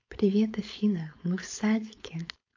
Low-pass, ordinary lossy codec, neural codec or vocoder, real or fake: 7.2 kHz; AAC, 48 kbps; codec, 16 kHz, 4.8 kbps, FACodec; fake